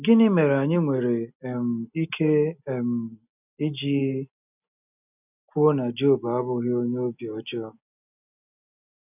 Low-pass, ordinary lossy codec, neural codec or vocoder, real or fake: 3.6 kHz; none; none; real